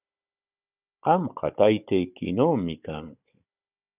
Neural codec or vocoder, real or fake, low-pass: codec, 16 kHz, 16 kbps, FunCodec, trained on Chinese and English, 50 frames a second; fake; 3.6 kHz